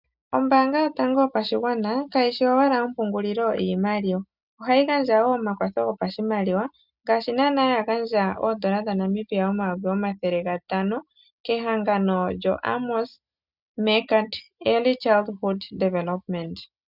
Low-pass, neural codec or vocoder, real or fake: 5.4 kHz; none; real